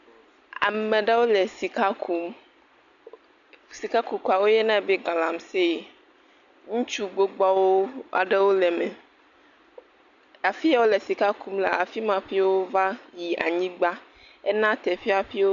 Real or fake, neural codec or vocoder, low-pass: real; none; 7.2 kHz